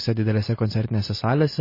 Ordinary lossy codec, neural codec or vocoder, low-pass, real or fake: MP3, 24 kbps; none; 5.4 kHz; real